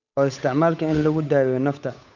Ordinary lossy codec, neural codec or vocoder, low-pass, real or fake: none; codec, 16 kHz, 8 kbps, FunCodec, trained on Chinese and English, 25 frames a second; 7.2 kHz; fake